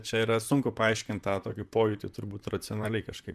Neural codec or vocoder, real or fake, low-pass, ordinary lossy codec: vocoder, 44.1 kHz, 128 mel bands, Pupu-Vocoder; fake; 14.4 kHz; MP3, 96 kbps